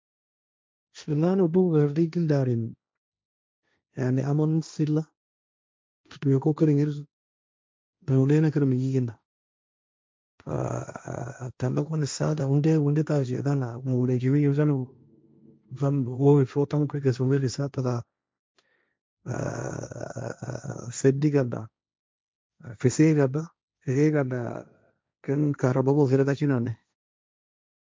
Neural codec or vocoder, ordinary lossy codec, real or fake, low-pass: codec, 16 kHz, 1.1 kbps, Voila-Tokenizer; none; fake; none